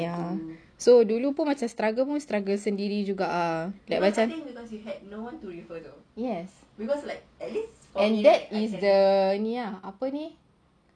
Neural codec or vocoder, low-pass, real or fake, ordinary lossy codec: none; 9.9 kHz; real; none